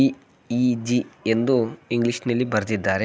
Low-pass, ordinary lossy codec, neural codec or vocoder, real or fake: none; none; none; real